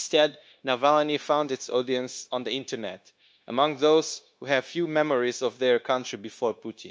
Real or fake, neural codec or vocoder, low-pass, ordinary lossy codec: fake; codec, 16 kHz, 0.9 kbps, LongCat-Audio-Codec; none; none